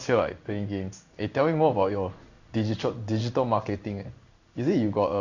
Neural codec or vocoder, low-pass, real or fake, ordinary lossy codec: codec, 16 kHz in and 24 kHz out, 1 kbps, XY-Tokenizer; 7.2 kHz; fake; none